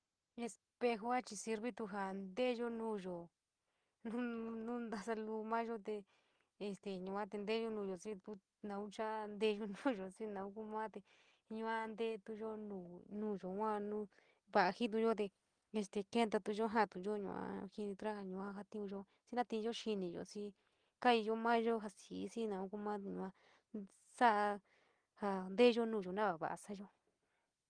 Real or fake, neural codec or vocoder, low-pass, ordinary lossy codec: real; none; 9.9 kHz; Opus, 24 kbps